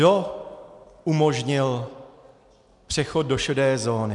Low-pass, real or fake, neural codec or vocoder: 10.8 kHz; real; none